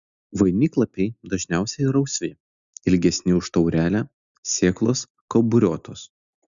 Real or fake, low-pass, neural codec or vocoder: real; 7.2 kHz; none